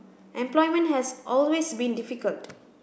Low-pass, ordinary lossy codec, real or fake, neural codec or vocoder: none; none; real; none